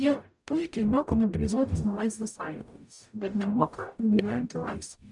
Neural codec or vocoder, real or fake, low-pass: codec, 44.1 kHz, 0.9 kbps, DAC; fake; 10.8 kHz